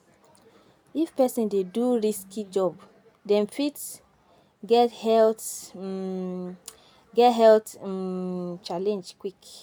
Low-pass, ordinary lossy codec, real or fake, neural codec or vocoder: none; none; real; none